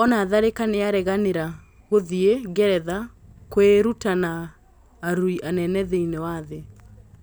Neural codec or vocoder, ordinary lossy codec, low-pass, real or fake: none; none; none; real